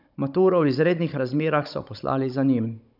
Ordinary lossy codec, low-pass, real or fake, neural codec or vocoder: none; 5.4 kHz; fake; codec, 16 kHz, 16 kbps, FunCodec, trained on Chinese and English, 50 frames a second